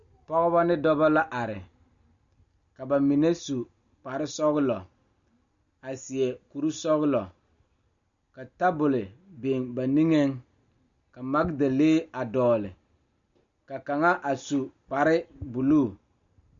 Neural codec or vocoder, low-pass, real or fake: none; 7.2 kHz; real